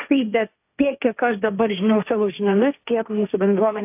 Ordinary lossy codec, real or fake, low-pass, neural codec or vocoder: AAC, 32 kbps; fake; 3.6 kHz; codec, 16 kHz, 1.1 kbps, Voila-Tokenizer